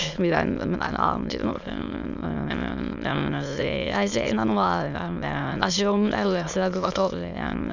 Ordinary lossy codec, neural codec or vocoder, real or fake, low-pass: none; autoencoder, 22.05 kHz, a latent of 192 numbers a frame, VITS, trained on many speakers; fake; 7.2 kHz